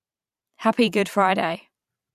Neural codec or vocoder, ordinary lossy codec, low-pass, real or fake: vocoder, 44.1 kHz, 128 mel bands every 512 samples, BigVGAN v2; none; 14.4 kHz; fake